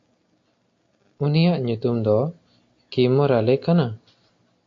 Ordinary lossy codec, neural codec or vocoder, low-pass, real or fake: AAC, 48 kbps; none; 7.2 kHz; real